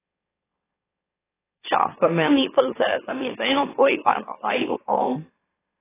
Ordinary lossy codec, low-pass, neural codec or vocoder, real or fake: AAC, 16 kbps; 3.6 kHz; autoencoder, 44.1 kHz, a latent of 192 numbers a frame, MeloTTS; fake